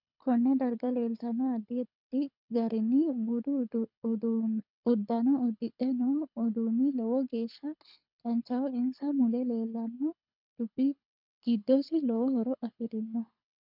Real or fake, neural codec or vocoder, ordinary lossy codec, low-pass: fake; codec, 24 kHz, 6 kbps, HILCodec; MP3, 48 kbps; 5.4 kHz